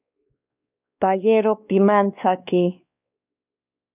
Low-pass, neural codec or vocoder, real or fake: 3.6 kHz; codec, 16 kHz, 2 kbps, X-Codec, WavLM features, trained on Multilingual LibriSpeech; fake